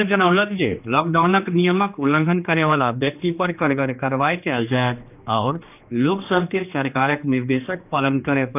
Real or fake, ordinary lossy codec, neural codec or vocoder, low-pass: fake; none; codec, 16 kHz, 2 kbps, X-Codec, HuBERT features, trained on general audio; 3.6 kHz